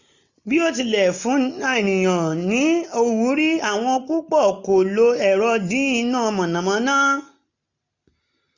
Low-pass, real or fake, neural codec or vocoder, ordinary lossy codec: 7.2 kHz; real; none; none